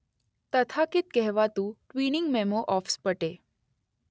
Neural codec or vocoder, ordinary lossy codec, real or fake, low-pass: none; none; real; none